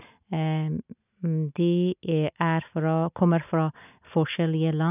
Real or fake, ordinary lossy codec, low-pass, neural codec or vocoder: real; none; 3.6 kHz; none